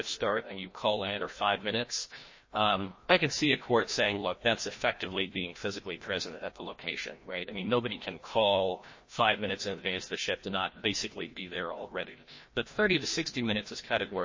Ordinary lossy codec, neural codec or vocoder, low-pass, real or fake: MP3, 32 kbps; codec, 16 kHz, 1 kbps, FreqCodec, larger model; 7.2 kHz; fake